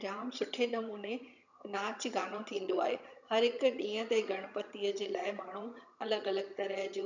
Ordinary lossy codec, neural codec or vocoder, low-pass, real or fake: none; vocoder, 22.05 kHz, 80 mel bands, HiFi-GAN; 7.2 kHz; fake